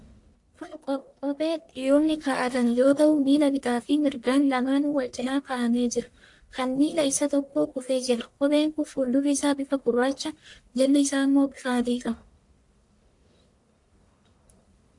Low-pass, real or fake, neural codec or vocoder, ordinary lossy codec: 10.8 kHz; fake; codec, 44.1 kHz, 1.7 kbps, Pupu-Codec; AAC, 64 kbps